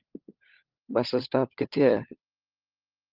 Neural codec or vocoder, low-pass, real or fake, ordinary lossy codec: codec, 16 kHz, 16 kbps, FunCodec, trained on LibriTTS, 50 frames a second; 5.4 kHz; fake; Opus, 24 kbps